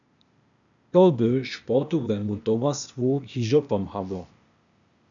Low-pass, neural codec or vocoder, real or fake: 7.2 kHz; codec, 16 kHz, 0.8 kbps, ZipCodec; fake